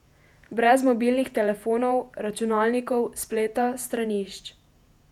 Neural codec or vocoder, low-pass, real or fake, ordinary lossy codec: vocoder, 48 kHz, 128 mel bands, Vocos; 19.8 kHz; fake; none